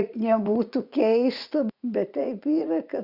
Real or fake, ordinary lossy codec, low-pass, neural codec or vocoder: fake; Opus, 64 kbps; 5.4 kHz; vocoder, 44.1 kHz, 80 mel bands, Vocos